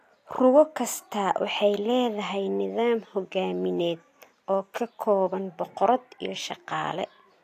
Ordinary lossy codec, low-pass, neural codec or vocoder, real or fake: AAC, 64 kbps; 14.4 kHz; none; real